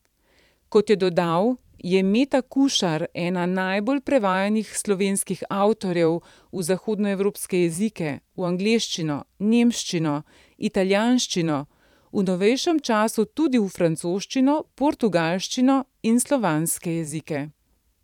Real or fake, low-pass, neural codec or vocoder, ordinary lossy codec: fake; 19.8 kHz; vocoder, 44.1 kHz, 128 mel bands every 512 samples, BigVGAN v2; none